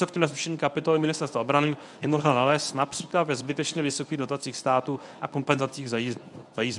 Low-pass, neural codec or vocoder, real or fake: 10.8 kHz; codec, 24 kHz, 0.9 kbps, WavTokenizer, medium speech release version 1; fake